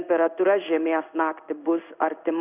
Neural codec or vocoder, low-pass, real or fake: codec, 16 kHz in and 24 kHz out, 1 kbps, XY-Tokenizer; 3.6 kHz; fake